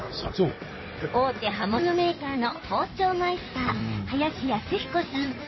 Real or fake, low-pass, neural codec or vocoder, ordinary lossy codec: fake; 7.2 kHz; codec, 16 kHz in and 24 kHz out, 2.2 kbps, FireRedTTS-2 codec; MP3, 24 kbps